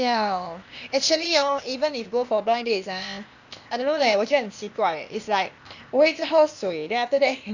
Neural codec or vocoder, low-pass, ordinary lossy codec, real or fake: codec, 16 kHz, 0.8 kbps, ZipCodec; 7.2 kHz; none; fake